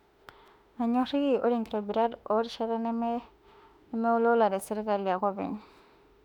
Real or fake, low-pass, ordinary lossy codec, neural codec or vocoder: fake; 19.8 kHz; none; autoencoder, 48 kHz, 32 numbers a frame, DAC-VAE, trained on Japanese speech